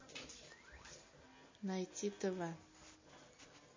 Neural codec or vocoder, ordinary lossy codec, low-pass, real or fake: none; MP3, 32 kbps; 7.2 kHz; real